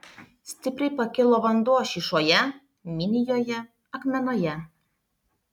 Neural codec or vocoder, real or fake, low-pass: none; real; 19.8 kHz